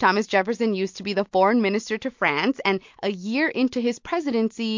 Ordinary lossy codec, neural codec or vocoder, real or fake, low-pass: MP3, 48 kbps; none; real; 7.2 kHz